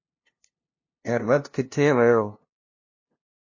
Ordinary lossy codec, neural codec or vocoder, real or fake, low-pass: MP3, 32 kbps; codec, 16 kHz, 0.5 kbps, FunCodec, trained on LibriTTS, 25 frames a second; fake; 7.2 kHz